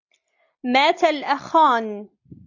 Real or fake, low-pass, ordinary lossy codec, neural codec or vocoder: real; 7.2 kHz; AAC, 48 kbps; none